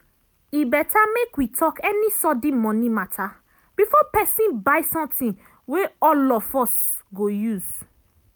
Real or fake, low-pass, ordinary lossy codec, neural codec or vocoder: real; none; none; none